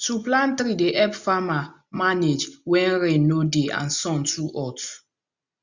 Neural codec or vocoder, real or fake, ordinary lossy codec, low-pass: none; real; Opus, 64 kbps; 7.2 kHz